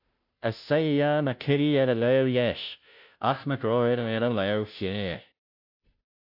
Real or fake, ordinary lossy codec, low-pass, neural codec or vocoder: fake; AAC, 48 kbps; 5.4 kHz; codec, 16 kHz, 0.5 kbps, FunCodec, trained on Chinese and English, 25 frames a second